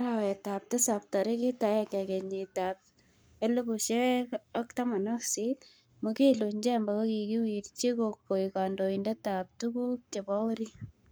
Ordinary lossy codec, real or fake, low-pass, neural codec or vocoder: none; fake; none; codec, 44.1 kHz, 7.8 kbps, Pupu-Codec